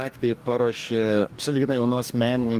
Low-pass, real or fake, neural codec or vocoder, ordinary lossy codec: 14.4 kHz; fake; codec, 44.1 kHz, 2.6 kbps, DAC; Opus, 32 kbps